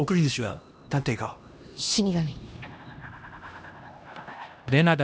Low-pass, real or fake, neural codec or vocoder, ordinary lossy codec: none; fake; codec, 16 kHz, 1 kbps, X-Codec, HuBERT features, trained on LibriSpeech; none